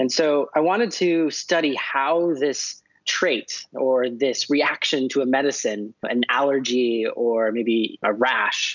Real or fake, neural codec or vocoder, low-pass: real; none; 7.2 kHz